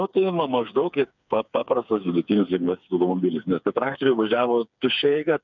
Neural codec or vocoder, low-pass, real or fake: codec, 16 kHz, 4 kbps, FreqCodec, smaller model; 7.2 kHz; fake